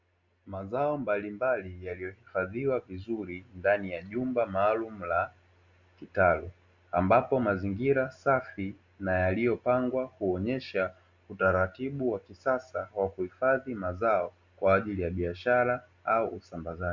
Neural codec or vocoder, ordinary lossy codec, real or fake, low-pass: none; Opus, 64 kbps; real; 7.2 kHz